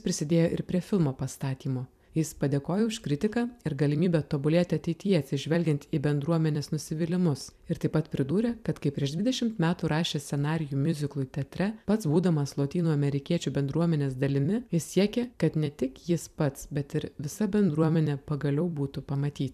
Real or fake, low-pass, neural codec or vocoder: fake; 14.4 kHz; vocoder, 44.1 kHz, 128 mel bands every 256 samples, BigVGAN v2